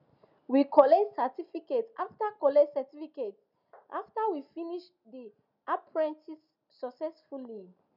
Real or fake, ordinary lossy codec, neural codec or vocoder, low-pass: real; none; none; 5.4 kHz